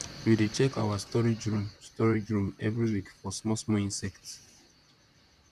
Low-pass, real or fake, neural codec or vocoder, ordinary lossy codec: 14.4 kHz; fake; vocoder, 44.1 kHz, 128 mel bands, Pupu-Vocoder; none